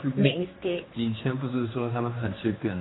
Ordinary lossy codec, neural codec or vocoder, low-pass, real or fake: AAC, 16 kbps; codec, 16 kHz, 2 kbps, X-Codec, HuBERT features, trained on general audio; 7.2 kHz; fake